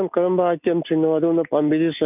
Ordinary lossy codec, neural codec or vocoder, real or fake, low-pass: none; none; real; 3.6 kHz